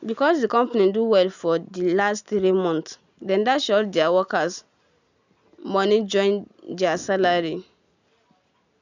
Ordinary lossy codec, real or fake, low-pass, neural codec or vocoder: none; real; 7.2 kHz; none